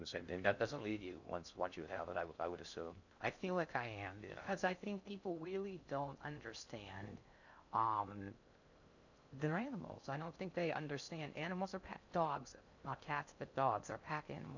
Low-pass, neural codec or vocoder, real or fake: 7.2 kHz; codec, 16 kHz in and 24 kHz out, 0.6 kbps, FocalCodec, streaming, 4096 codes; fake